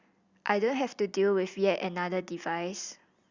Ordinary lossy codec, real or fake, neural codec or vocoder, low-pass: Opus, 64 kbps; real; none; 7.2 kHz